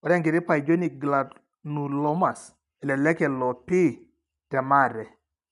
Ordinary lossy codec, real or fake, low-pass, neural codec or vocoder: none; real; 10.8 kHz; none